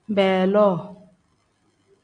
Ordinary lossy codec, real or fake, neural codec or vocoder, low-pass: MP3, 64 kbps; real; none; 9.9 kHz